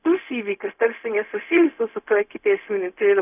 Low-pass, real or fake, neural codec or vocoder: 3.6 kHz; fake; codec, 16 kHz, 0.4 kbps, LongCat-Audio-Codec